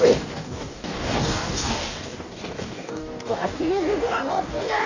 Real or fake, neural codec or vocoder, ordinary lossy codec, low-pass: fake; codec, 44.1 kHz, 2.6 kbps, DAC; none; 7.2 kHz